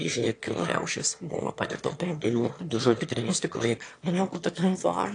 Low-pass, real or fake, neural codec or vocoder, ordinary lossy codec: 9.9 kHz; fake; autoencoder, 22.05 kHz, a latent of 192 numbers a frame, VITS, trained on one speaker; AAC, 48 kbps